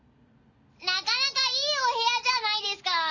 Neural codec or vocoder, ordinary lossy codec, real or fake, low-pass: none; none; real; 7.2 kHz